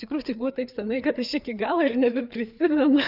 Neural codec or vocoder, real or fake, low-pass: codec, 16 kHz, 4 kbps, FreqCodec, larger model; fake; 5.4 kHz